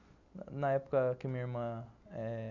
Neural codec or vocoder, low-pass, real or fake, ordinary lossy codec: none; 7.2 kHz; real; none